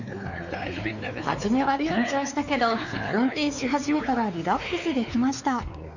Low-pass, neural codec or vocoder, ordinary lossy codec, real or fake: 7.2 kHz; codec, 16 kHz, 4 kbps, X-Codec, WavLM features, trained on Multilingual LibriSpeech; none; fake